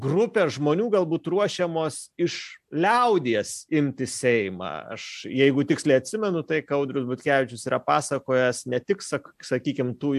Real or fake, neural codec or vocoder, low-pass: real; none; 14.4 kHz